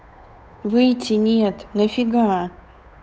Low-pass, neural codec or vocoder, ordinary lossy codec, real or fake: none; codec, 16 kHz, 8 kbps, FunCodec, trained on Chinese and English, 25 frames a second; none; fake